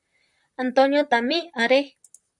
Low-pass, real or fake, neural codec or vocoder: 10.8 kHz; fake; vocoder, 44.1 kHz, 128 mel bands, Pupu-Vocoder